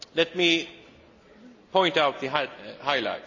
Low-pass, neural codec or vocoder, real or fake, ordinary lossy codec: 7.2 kHz; none; real; AAC, 48 kbps